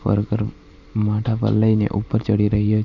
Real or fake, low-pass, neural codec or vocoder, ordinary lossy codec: fake; 7.2 kHz; vocoder, 44.1 kHz, 128 mel bands every 512 samples, BigVGAN v2; none